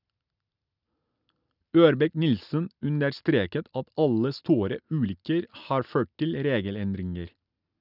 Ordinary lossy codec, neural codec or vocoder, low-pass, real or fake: none; none; 5.4 kHz; real